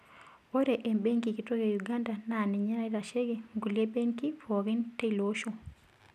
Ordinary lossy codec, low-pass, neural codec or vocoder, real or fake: none; 14.4 kHz; none; real